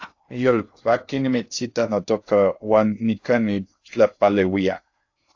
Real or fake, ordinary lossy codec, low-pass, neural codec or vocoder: fake; AAC, 48 kbps; 7.2 kHz; codec, 16 kHz in and 24 kHz out, 0.6 kbps, FocalCodec, streaming, 2048 codes